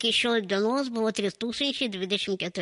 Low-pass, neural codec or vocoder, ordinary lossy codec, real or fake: 10.8 kHz; none; MP3, 48 kbps; real